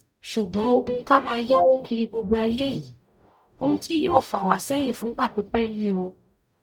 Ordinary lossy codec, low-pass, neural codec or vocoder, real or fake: none; 19.8 kHz; codec, 44.1 kHz, 0.9 kbps, DAC; fake